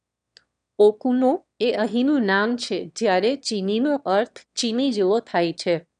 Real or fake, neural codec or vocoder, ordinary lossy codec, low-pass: fake; autoencoder, 22.05 kHz, a latent of 192 numbers a frame, VITS, trained on one speaker; none; 9.9 kHz